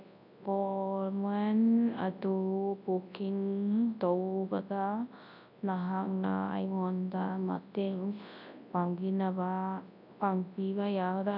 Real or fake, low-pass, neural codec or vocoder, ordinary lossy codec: fake; 5.4 kHz; codec, 24 kHz, 0.9 kbps, WavTokenizer, large speech release; none